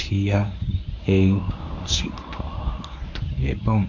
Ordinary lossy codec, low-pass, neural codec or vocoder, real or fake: MP3, 48 kbps; 7.2 kHz; codec, 24 kHz, 0.9 kbps, WavTokenizer, medium speech release version 1; fake